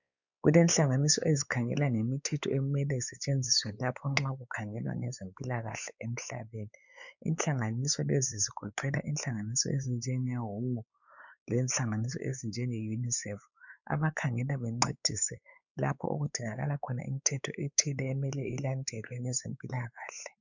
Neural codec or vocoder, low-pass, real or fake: codec, 16 kHz, 4 kbps, X-Codec, WavLM features, trained on Multilingual LibriSpeech; 7.2 kHz; fake